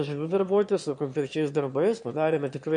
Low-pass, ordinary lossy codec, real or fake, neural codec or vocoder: 9.9 kHz; MP3, 48 kbps; fake; autoencoder, 22.05 kHz, a latent of 192 numbers a frame, VITS, trained on one speaker